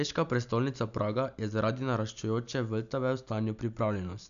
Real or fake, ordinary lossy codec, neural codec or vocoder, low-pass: real; none; none; 7.2 kHz